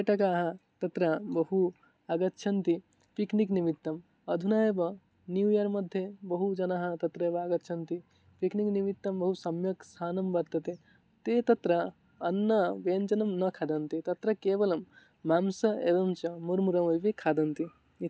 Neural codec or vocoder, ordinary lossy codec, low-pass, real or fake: none; none; none; real